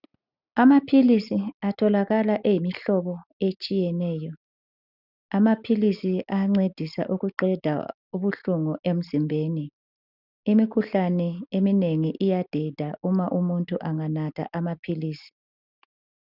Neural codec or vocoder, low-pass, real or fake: none; 5.4 kHz; real